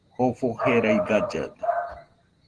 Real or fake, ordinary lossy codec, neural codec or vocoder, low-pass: real; Opus, 16 kbps; none; 9.9 kHz